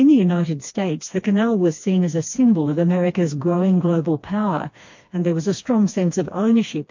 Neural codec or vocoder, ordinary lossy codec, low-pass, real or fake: codec, 16 kHz, 2 kbps, FreqCodec, smaller model; MP3, 48 kbps; 7.2 kHz; fake